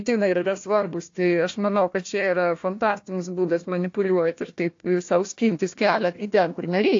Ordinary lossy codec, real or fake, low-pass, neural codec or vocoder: AAC, 48 kbps; fake; 7.2 kHz; codec, 16 kHz, 1 kbps, FunCodec, trained on Chinese and English, 50 frames a second